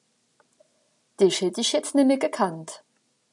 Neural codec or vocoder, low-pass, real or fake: none; 10.8 kHz; real